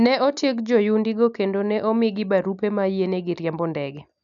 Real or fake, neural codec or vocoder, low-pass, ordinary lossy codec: real; none; 7.2 kHz; none